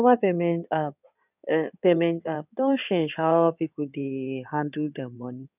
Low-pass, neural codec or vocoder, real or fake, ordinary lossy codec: 3.6 kHz; codec, 16 kHz in and 24 kHz out, 2.2 kbps, FireRedTTS-2 codec; fake; none